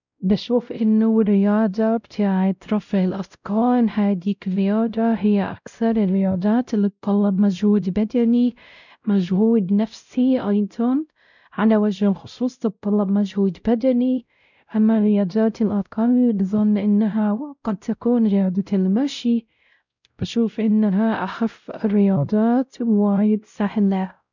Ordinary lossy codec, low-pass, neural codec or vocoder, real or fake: none; 7.2 kHz; codec, 16 kHz, 0.5 kbps, X-Codec, WavLM features, trained on Multilingual LibriSpeech; fake